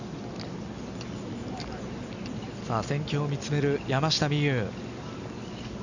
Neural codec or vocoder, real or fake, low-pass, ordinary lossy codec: none; real; 7.2 kHz; none